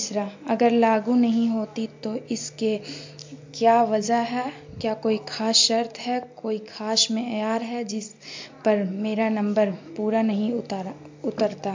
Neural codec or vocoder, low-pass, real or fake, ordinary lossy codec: none; 7.2 kHz; real; MP3, 48 kbps